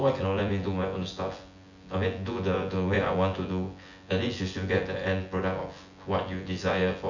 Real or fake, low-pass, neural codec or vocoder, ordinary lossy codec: fake; 7.2 kHz; vocoder, 24 kHz, 100 mel bands, Vocos; none